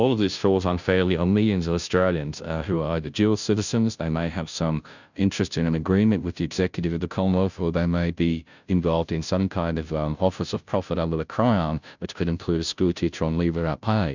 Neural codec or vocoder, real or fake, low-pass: codec, 16 kHz, 0.5 kbps, FunCodec, trained on Chinese and English, 25 frames a second; fake; 7.2 kHz